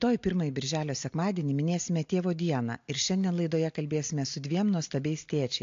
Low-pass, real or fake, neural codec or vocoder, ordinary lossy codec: 7.2 kHz; real; none; AAC, 48 kbps